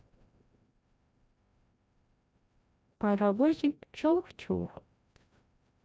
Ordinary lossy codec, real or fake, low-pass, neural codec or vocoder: none; fake; none; codec, 16 kHz, 0.5 kbps, FreqCodec, larger model